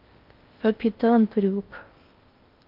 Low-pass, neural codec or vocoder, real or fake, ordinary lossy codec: 5.4 kHz; codec, 16 kHz in and 24 kHz out, 0.6 kbps, FocalCodec, streaming, 4096 codes; fake; Opus, 32 kbps